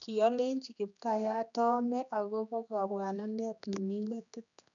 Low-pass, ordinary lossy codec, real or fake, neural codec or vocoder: 7.2 kHz; none; fake; codec, 16 kHz, 2 kbps, X-Codec, HuBERT features, trained on general audio